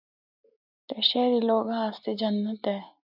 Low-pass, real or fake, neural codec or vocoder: 5.4 kHz; real; none